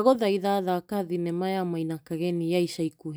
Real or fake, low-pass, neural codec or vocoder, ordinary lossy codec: real; none; none; none